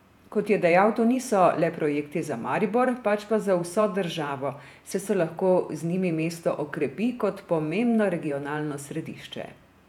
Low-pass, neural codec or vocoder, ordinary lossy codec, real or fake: 19.8 kHz; vocoder, 48 kHz, 128 mel bands, Vocos; none; fake